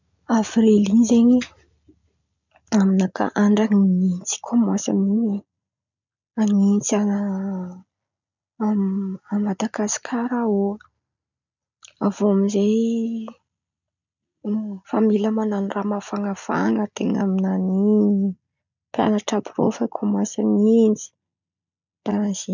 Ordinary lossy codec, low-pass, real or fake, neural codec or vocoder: none; 7.2 kHz; real; none